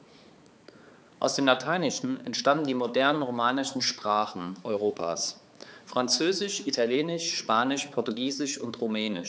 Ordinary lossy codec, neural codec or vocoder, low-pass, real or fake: none; codec, 16 kHz, 4 kbps, X-Codec, HuBERT features, trained on balanced general audio; none; fake